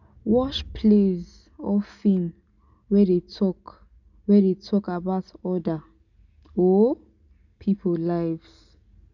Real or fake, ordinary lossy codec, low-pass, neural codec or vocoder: real; none; 7.2 kHz; none